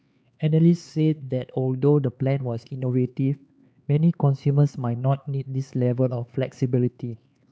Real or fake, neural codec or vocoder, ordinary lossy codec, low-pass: fake; codec, 16 kHz, 4 kbps, X-Codec, HuBERT features, trained on LibriSpeech; none; none